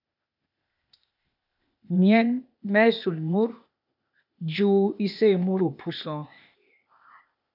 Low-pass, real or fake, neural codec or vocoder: 5.4 kHz; fake; codec, 16 kHz, 0.8 kbps, ZipCodec